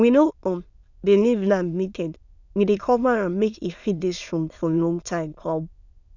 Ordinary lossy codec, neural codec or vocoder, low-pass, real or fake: none; autoencoder, 22.05 kHz, a latent of 192 numbers a frame, VITS, trained on many speakers; 7.2 kHz; fake